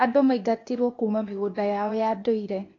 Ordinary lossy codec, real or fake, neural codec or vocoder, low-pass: AAC, 32 kbps; fake; codec, 16 kHz, 0.8 kbps, ZipCodec; 7.2 kHz